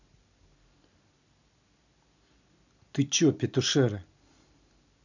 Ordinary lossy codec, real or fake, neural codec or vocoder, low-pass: none; real; none; 7.2 kHz